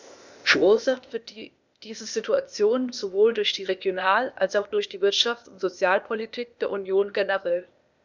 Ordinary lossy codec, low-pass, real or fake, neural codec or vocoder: none; 7.2 kHz; fake; codec, 16 kHz, 0.8 kbps, ZipCodec